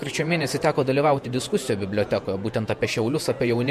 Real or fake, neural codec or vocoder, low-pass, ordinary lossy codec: fake; vocoder, 48 kHz, 128 mel bands, Vocos; 14.4 kHz; MP3, 64 kbps